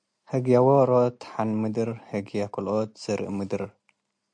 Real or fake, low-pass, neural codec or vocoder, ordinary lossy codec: real; 9.9 kHz; none; MP3, 64 kbps